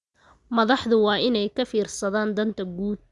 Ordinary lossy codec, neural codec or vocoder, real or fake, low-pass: Opus, 64 kbps; none; real; 10.8 kHz